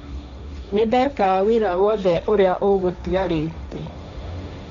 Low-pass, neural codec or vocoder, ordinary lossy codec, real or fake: 7.2 kHz; codec, 16 kHz, 1.1 kbps, Voila-Tokenizer; none; fake